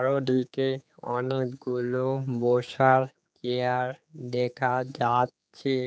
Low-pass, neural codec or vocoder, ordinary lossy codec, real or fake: none; codec, 16 kHz, 4 kbps, X-Codec, HuBERT features, trained on general audio; none; fake